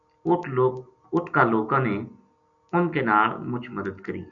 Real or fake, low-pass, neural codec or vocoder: real; 7.2 kHz; none